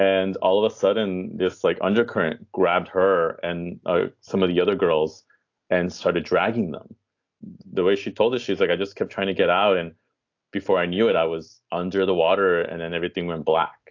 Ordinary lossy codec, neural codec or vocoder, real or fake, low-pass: AAC, 48 kbps; none; real; 7.2 kHz